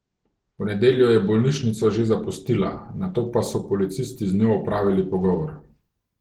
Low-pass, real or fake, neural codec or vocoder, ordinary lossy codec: 19.8 kHz; real; none; Opus, 16 kbps